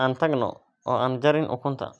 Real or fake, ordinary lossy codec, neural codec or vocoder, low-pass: real; none; none; none